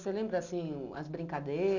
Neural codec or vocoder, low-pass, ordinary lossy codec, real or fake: none; 7.2 kHz; none; real